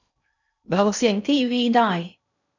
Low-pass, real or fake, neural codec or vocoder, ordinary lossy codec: 7.2 kHz; fake; codec, 16 kHz in and 24 kHz out, 0.6 kbps, FocalCodec, streaming, 2048 codes; none